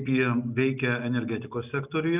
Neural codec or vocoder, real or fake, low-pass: none; real; 3.6 kHz